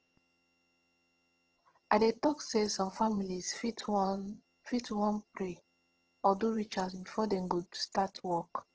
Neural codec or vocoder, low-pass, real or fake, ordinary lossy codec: vocoder, 22.05 kHz, 80 mel bands, HiFi-GAN; 7.2 kHz; fake; Opus, 16 kbps